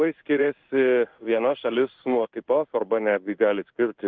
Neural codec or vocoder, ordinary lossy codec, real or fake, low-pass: codec, 16 kHz in and 24 kHz out, 1 kbps, XY-Tokenizer; Opus, 32 kbps; fake; 7.2 kHz